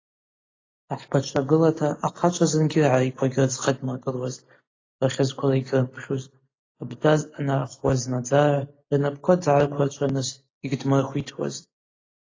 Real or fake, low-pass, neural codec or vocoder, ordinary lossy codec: real; 7.2 kHz; none; AAC, 32 kbps